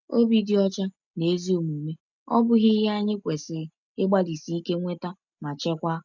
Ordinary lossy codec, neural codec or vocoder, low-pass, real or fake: none; none; 7.2 kHz; real